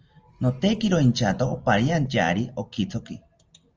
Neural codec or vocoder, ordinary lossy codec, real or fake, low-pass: none; Opus, 24 kbps; real; 7.2 kHz